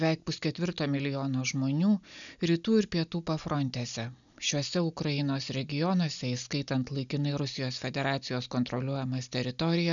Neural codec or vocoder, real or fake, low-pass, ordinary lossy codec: none; real; 7.2 kHz; MP3, 96 kbps